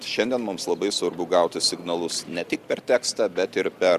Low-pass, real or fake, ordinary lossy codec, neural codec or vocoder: 14.4 kHz; real; Opus, 64 kbps; none